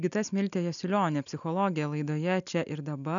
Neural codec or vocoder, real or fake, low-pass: none; real; 7.2 kHz